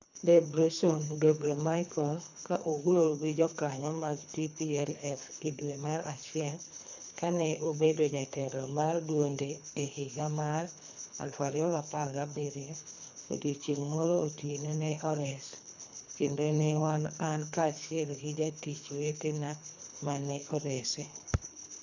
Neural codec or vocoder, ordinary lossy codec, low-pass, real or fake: codec, 24 kHz, 3 kbps, HILCodec; none; 7.2 kHz; fake